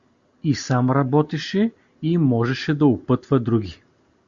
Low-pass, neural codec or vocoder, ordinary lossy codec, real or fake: 7.2 kHz; none; Opus, 64 kbps; real